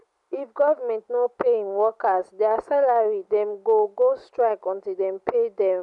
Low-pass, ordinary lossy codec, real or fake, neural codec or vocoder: 10.8 kHz; none; real; none